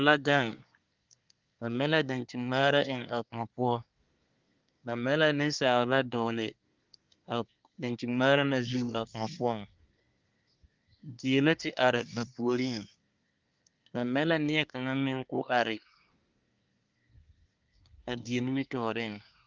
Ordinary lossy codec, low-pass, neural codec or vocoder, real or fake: Opus, 24 kbps; 7.2 kHz; codec, 24 kHz, 1 kbps, SNAC; fake